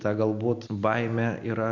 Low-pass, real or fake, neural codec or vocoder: 7.2 kHz; real; none